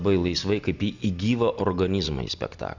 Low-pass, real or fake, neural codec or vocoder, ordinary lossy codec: 7.2 kHz; real; none; Opus, 64 kbps